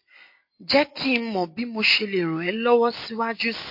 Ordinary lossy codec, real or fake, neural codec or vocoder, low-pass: MP3, 32 kbps; real; none; 5.4 kHz